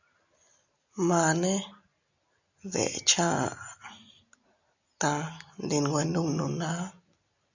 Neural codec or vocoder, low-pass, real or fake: none; 7.2 kHz; real